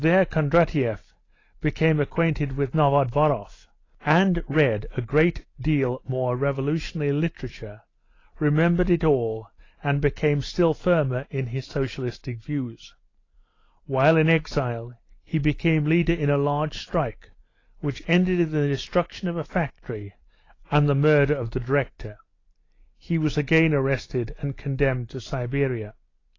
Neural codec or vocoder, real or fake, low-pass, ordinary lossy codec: none; real; 7.2 kHz; AAC, 32 kbps